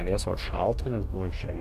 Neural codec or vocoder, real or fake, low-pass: codec, 44.1 kHz, 2.6 kbps, DAC; fake; 14.4 kHz